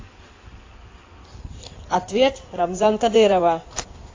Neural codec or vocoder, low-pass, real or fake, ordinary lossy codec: codec, 16 kHz in and 24 kHz out, 2.2 kbps, FireRedTTS-2 codec; 7.2 kHz; fake; AAC, 32 kbps